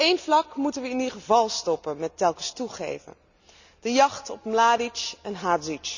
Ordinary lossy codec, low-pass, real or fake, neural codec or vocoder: none; 7.2 kHz; real; none